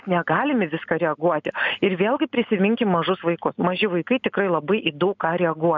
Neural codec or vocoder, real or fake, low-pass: none; real; 7.2 kHz